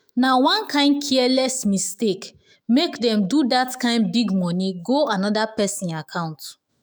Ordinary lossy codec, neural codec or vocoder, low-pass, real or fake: none; autoencoder, 48 kHz, 128 numbers a frame, DAC-VAE, trained on Japanese speech; none; fake